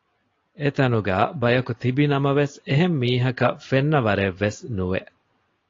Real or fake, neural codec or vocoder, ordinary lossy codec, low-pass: real; none; AAC, 32 kbps; 7.2 kHz